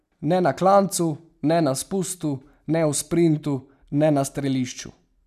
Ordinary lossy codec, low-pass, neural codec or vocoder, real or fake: none; 14.4 kHz; none; real